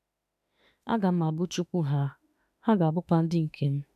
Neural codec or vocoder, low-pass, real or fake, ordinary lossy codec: autoencoder, 48 kHz, 32 numbers a frame, DAC-VAE, trained on Japanese speech; 14.4 kHz; fake; none